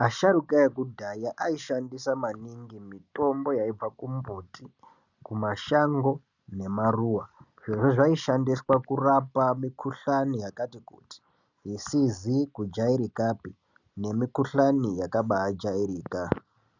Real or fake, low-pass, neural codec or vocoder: real; 7.2 kHz; none